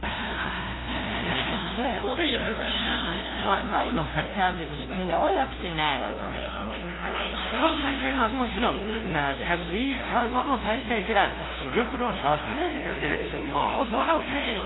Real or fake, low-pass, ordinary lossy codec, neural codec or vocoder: fake; 7.2 kHz; AAC, 16 kbps; codec, 16 kHz, 0.5 kbps, FunCodec, trained on LibriTTS, 25 frames a second